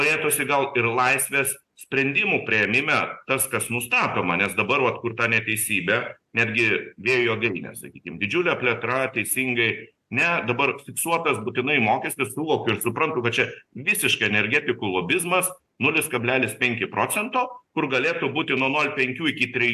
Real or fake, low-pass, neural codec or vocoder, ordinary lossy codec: real; 14.4 kHz; none; AAC, 96 kbps